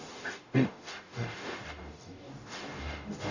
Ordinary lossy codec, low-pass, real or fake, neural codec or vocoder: none; 7.2 kHz; fake; codec, 44.1 kHz, 0.9 kbps, DAC